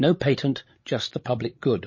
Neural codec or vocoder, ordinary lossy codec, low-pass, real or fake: codec, 16 kHz, 16 kbps, FreqCodec, larger model; MP3, 32 kbps; 7.2 kHz; fake